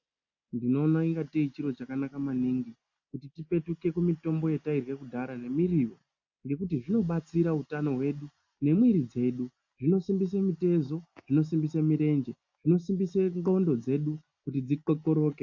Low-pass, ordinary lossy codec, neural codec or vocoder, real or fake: 7.2 kHz; AAC, 48 kbps; none; real